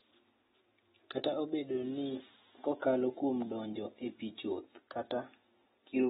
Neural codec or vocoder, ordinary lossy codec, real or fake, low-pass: none; AAC, 16 kbps; real; 19.8 kHz